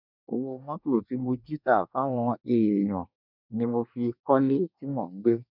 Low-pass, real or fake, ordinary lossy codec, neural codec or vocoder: 5.4 kHz; fake; none; codec, 24 kHz, 1 kbps, SNAC